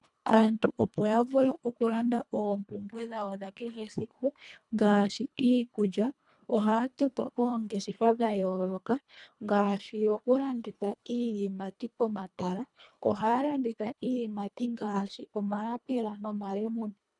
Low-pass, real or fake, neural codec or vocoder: 10.8 kHz; fake; codec, 24 kHz, 1.5 kbps, HILCodec